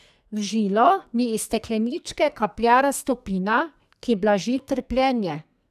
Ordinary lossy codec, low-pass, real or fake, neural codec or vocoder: none; 14.4 kHz; fake; codec, 44.1 kHz, 2.6 kbps, SNAC